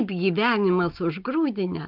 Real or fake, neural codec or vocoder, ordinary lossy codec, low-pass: real; none; Opus, 32 kbps; 5.4 kHz